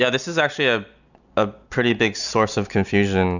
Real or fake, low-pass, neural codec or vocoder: real; 7.2 kHz; none